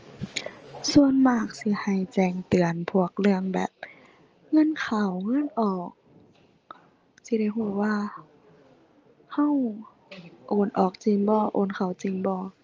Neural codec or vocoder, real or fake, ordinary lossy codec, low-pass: none; real; Opus, 24 kbps; 7.2 kHz